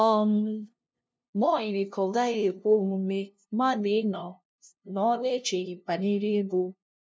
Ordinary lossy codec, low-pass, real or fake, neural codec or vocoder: none; none; fake; codec, 16 kHz, 0.5 kbps, FunCodec, trained on LibriTTS, 25 frames a second